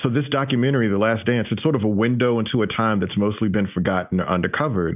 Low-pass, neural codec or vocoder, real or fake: 3.6 kHz; none; real